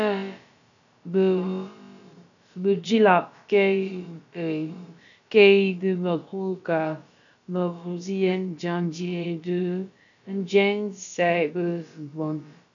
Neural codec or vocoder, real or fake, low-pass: codec, 16 kHz, about 1 kbps, DyCAST, with the encoder's durations; fake; 7.2 kHz